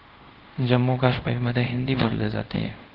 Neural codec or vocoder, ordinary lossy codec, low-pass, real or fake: codec, 24 kHz, 0.5 kbps, DualCodec; Opus, 16 kbps; 5.4 kHz; fake